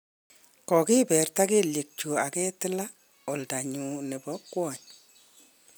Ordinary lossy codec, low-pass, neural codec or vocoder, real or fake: none; none; none; real